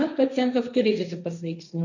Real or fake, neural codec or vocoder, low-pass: fake; codec, 16 kHz, 1.1 kbps, Voila-Tokenizer; 7.2 kHz